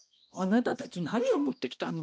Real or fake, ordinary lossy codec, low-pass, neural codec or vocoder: fake; none; none; codec, 16 kHz, 1 kbps, X-Codec, HuBERT features, trained on balanced general audio